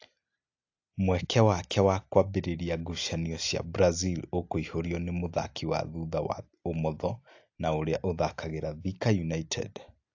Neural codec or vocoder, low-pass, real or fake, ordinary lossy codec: none; 7.2 kHz; real; AAC, 48 kbps